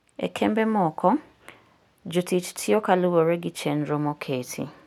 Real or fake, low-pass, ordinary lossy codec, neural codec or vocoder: real; 19.8 kHz; none; none